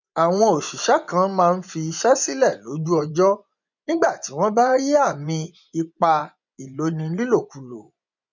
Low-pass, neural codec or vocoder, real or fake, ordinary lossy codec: 7.2 kHz; vocoder, 24 kHz, 100 mel bands, Vocos; fake; none